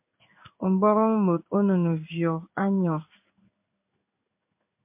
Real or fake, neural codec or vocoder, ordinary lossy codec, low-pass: fake; codec, 16 kHz in and 24 kHz out, 1 kbps, XY-Tokenizer; MP3, 32 kbps; 3.6 kHz